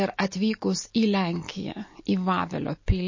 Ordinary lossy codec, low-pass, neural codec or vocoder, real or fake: MP3, 32 kbps; 7.2 kHz; none; real